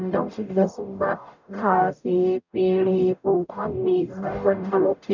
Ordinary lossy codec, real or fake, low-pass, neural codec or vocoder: none; fake; 7.2 kHz; codec, 44.1 kHz, 0.9 kbps, DAC